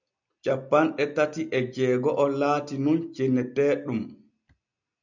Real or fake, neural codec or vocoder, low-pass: real; none; 7.2 kHz